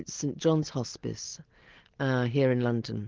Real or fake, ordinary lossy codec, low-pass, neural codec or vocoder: real; Opus, 16 kbps; 7.2 kHz; none